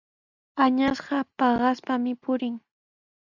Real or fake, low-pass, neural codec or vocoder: real; 7.2 kHz; none